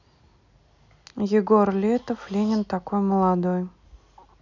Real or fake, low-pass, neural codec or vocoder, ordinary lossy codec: real; 7.2 kHz; none; none